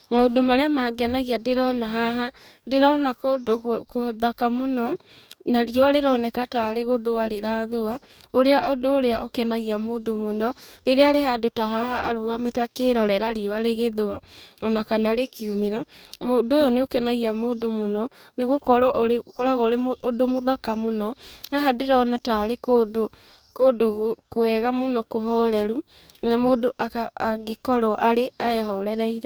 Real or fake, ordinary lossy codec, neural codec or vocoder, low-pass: fake; none; codec, 44.1 kHz, 2.6 kbps, DAC; none